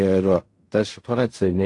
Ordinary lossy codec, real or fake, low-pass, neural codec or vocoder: AAC, 48 kbps; fake; 10.8 kHz; codec, 16 kHz in and 24 kHz out, 0.4 kbps, LongCat-Audio-Codec, fine tuned four codebook decoder